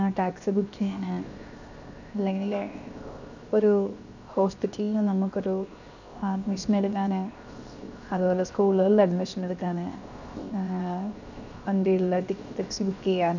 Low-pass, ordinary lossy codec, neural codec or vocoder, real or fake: 7.2 kHz; none; codec, 16 kHz, 0.7 kbps, FocalCodec; fake